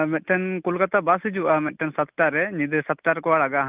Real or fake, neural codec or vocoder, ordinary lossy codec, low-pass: real; none; Opus, 24 kbps; 3.6 kHz